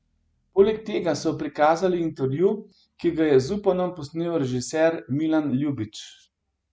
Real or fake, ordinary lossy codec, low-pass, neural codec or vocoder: real; none; none; none